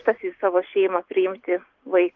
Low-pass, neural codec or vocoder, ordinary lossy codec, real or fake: 7.2 kHz; none; Opus, 24 kbps; real